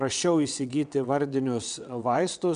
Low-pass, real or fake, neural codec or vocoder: 9.9 kHz; fake; vocoder, 22.05 kHz, 80 mel bands, WaveNeXt